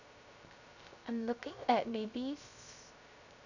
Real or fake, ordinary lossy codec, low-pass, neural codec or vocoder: fake; none; 7.2 kHz; codec, 16 kHz, 0.3 kbps, FocalCodec